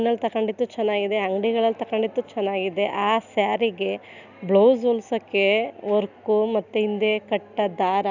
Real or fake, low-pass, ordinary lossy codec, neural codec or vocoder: real; 7.2 kHz; none; none